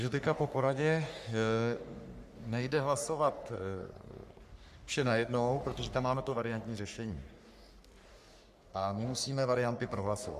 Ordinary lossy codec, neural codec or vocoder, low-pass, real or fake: Opus, 64 kbps; codec, 44.1 kHz, 3.4 kbps, Pupu-Codec; 14.4 kHz; fake